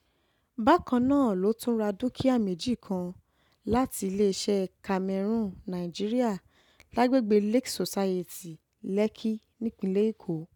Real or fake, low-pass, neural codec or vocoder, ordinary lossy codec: real; 19.8 kHz; none; none